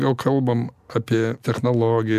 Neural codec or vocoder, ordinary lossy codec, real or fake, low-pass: none; AAC, 96 kbps; real; 14.4 kHz